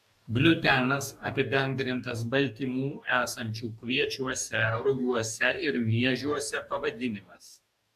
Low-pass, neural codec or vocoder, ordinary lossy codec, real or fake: 14.4 kHz; codec, 44.1 kHz, 2.6 kbps, DAC; AAC, 96 kbps; fake